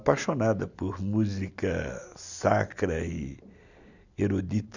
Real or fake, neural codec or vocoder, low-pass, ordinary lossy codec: real; none; 7.2 kHz; none